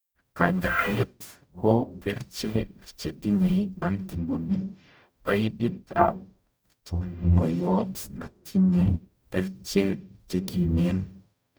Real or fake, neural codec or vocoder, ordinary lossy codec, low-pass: fake; codec, 44.1 kHz, 0.9 kbps, DAC; none; none